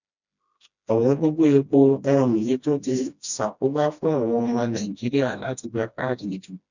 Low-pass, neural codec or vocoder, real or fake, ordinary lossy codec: 7.2 kHz; codec, 16 kHz, 1 kbps, FreqCodec, smaller model; fake; none